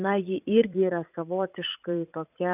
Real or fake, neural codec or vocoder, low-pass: fake; vocoder, 44.1 kHz, 80 mel bands, Vocos; 3.6 kHz